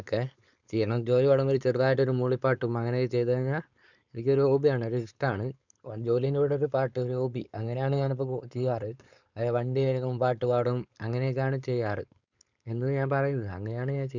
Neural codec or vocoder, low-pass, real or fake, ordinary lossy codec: codec, 16 kHz, 4.8 kbps, FACodec; 7.2 kHz; fake; none